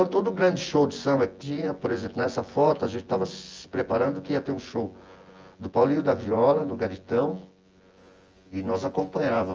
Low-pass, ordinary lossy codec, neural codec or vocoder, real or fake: 7.2 kHz; Opus, 24 kbps; vocoder, 24 kHz, 100 mel bands, Vocos; fake